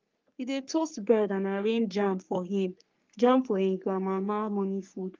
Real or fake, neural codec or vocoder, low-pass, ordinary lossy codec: fake; codec, 44.1 kHz, 3.4 kbps, Pupu-Codec; 7.2 kHz; Opus, 24 kbps